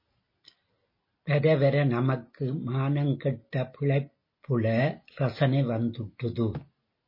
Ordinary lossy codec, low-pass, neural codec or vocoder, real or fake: MP3, 24 kbps; 5.4 kHz; none; real